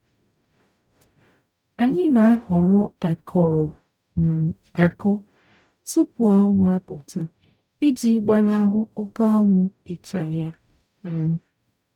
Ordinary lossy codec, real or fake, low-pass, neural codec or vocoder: none; fake; 19.8 kHz; codec, 44.1 kHz, 0.9 kbps, DAC